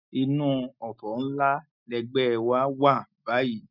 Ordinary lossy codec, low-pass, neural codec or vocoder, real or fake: none; 5.4 kHz; none; real